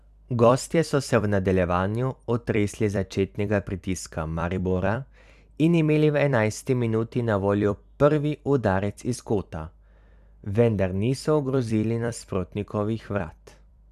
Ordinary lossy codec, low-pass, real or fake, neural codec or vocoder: none; 14.4 kHz; fake; vocoder, 44.1 kHz, 128 mel bands every 256 samples, BigVGAN v2